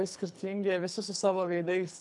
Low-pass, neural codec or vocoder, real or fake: 10.8 kHz; codec, 24 kHz, 3 kbps, HILCodec; fake